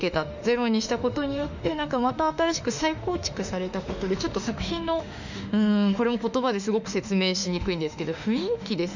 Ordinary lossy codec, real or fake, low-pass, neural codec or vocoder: none; fake; 7.2 kHz; autoencoder, 48 kHz, 32 numbers a frame, DAC-VAE, trained on Japanese speech